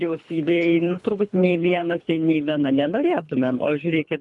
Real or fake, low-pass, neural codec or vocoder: fake; 10.8 kHz; codec, 24 kHz, 3 kbps, HILCodec